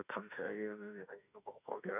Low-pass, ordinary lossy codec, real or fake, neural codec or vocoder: 3.6 kHz; none; fake; autoencoder, 48 kHz, 32 numbers a frame, DAC-VAE, trained on Japanese speech